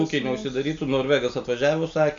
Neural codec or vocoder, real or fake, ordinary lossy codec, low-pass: none; real; MP3, 64 kbps; 7.2 kHz